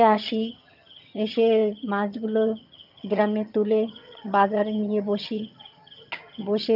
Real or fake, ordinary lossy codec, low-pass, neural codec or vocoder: fake; none; 5.4 kHz; vocoder, 22.05 kHz, 80 mel bands, HiFi-GAN